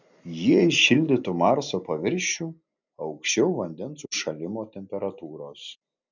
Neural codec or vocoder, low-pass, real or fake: none; 7.2 kHz; real